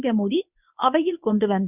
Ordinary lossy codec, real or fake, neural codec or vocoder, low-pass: none; fake; codec, 24 kHz, 0.9 kbps, WavTokenizer, medium speech release version 1; 3.6 kHz